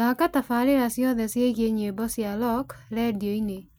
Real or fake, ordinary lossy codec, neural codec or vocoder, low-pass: real; none; none; none